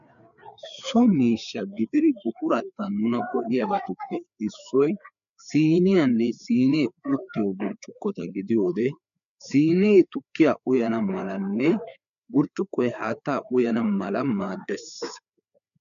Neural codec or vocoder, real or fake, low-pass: codec, 16 kHz, 4 kbps, FreqCodec, larger model; fake; 7.2 kHz